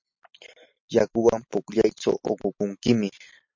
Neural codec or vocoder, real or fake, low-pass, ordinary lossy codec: none; real; 7.2 kHz; MP3, 48 kbps